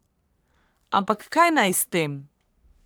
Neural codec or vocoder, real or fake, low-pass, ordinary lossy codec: codec, 44.1 kHz, 3.4 kbps, Pupu-Codec; fake; none; none